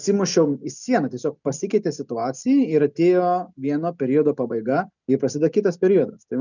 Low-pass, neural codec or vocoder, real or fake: 7.2 kHz; none; real